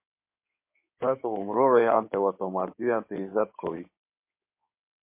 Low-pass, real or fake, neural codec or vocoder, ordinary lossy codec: 3.6 kHz; fake; codec, 16 kHz in and 24 kHz out, 2.2 kbps, FireRedTTS-2 codec; MP3, 24 kbps